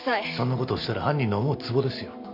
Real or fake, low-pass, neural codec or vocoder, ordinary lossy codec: real; 5.4 kHz; none; AAC, 32 kbps